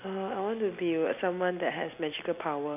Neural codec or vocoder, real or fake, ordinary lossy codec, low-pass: none; real; none; 3.6 kHz